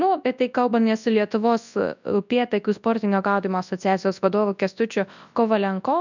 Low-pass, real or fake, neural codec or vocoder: 7.2 kHz; fake; codec, 24 kHz, 0.9 kbps, WavTokenizer, large speech release